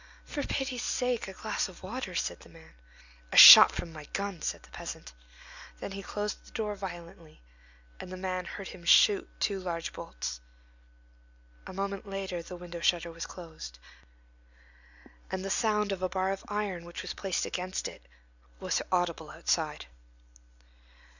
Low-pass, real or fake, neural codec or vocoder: 7.2 kHz; real; none